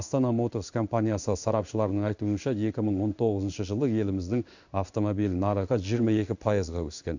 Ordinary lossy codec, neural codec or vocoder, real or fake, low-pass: none; codec, 16 kHz in and 24 kHz out, 1 kbps, XY-Tokenizer; fake; 7.2 kHz